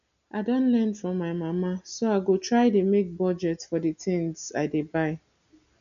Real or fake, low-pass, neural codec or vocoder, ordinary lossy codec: real; 7.2 kHz; none; none